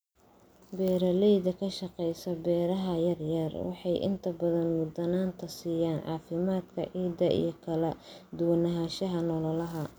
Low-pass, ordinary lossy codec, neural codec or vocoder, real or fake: none; none; none; real